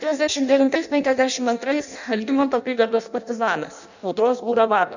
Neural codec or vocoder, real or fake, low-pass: codec, 16 kHz in and 24 kHz out, 0.6 kbps, FireRedTTS-2 codec; fake; 7.2 kHz